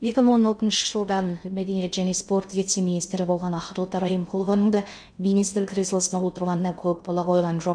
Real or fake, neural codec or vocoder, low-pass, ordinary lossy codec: fake; codec, 16 kHz in and 24 kHz out, 0.6 kbps, FocalCodec, streaming, 2048 codes; 9.9 kHz; none